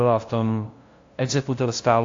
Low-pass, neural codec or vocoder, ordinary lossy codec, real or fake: 7.2 kHz; codec, 16 kHz, 0.5 kbps, FunCodec, trained on LibriTTS, 25 frames a second; AAC, 48 kbps; fake